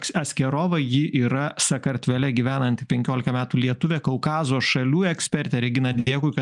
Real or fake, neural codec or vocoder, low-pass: real; none; 10.8 kHz